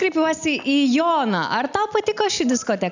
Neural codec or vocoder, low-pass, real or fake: none; 7.2 kHz; real